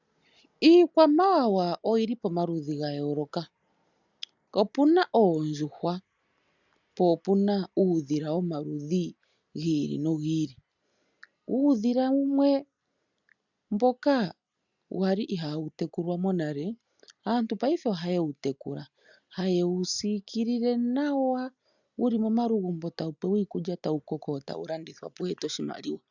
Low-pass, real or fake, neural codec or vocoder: 7.2 kHz; real; none